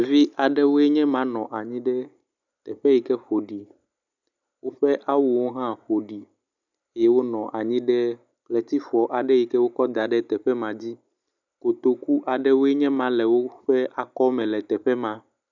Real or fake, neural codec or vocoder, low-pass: real; none; 7.2 kHz